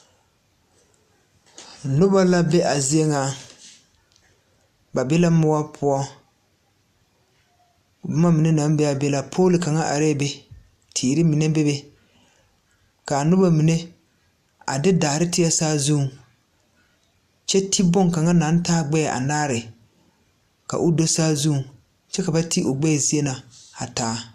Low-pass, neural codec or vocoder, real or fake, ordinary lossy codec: 14.4 kHz; none; real; AAC, 96 kbps